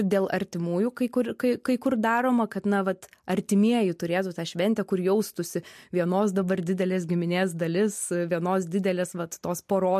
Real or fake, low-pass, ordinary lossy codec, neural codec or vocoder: real; 14.4 kHz; MP3, 64 kbps; none